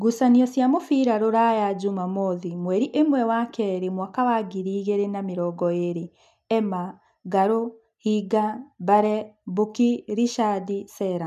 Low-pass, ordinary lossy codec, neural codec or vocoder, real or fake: 14.4 kHz; MP3, 96 kbps; none; real